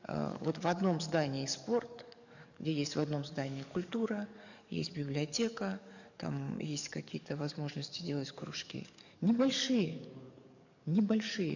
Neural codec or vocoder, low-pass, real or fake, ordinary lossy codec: codec, 44.1 kHz, 7.8 kbps, DAC; 7.2 kHz; fake; none